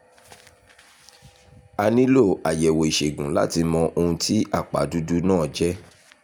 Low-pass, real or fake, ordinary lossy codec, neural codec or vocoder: 19.8 kHz; real; none; none